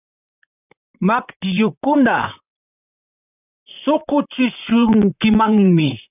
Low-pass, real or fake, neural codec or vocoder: 3.6 kHz; fake; vocoder, 44.1 kHz, 128 mel bands, Pupu-Vocoder